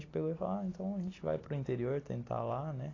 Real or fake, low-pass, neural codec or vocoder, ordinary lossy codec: real; 7.2 kHz; none; AAC, 32 kbps